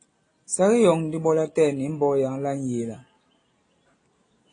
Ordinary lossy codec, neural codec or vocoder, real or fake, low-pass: AAC, 32 kbps; none; real; 9.9 kHz